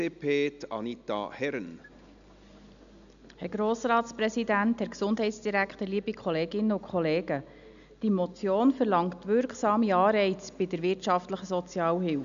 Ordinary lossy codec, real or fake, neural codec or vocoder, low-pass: none; real; none; 7.2 kHz